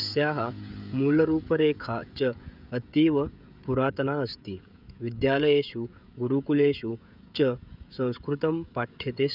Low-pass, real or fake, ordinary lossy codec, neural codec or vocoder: 5.4 kHz; fake; none; codec, 16 kHz, 16 kbps, FreqCodec, smaller model